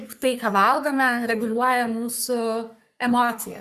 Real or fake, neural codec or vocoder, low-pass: fake; codec, 44.1 kHz, 3.4 kbps, Pupu-Codec; 14.4 kHz